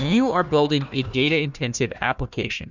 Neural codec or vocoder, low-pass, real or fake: codec, 16 kHz, 1 kbps, FunCodec, trained on Chinese and English, 50 frames a second; 7.2 kHz; fake